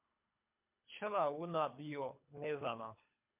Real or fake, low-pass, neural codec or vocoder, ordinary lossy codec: fake; 3.6 kHz; codec, 24 kHz, 3 kbps, HILCodec; MP3, 32 kbps